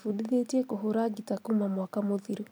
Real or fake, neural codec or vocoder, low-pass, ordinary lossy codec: real; none; none; none